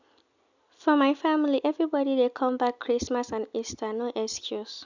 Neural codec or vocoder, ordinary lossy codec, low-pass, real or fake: none; none; 7.2 kHz; real